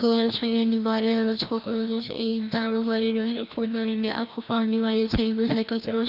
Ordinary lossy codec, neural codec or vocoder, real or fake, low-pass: none; codec, 16 kHz, 1 kbps, FreqCodec, larger model; fake; 5.4 kHz